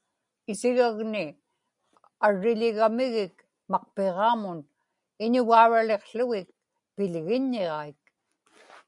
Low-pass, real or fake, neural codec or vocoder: 10.8 kHz; real; none